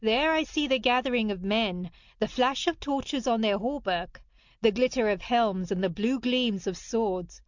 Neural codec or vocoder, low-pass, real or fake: none; 7.2 kHz; real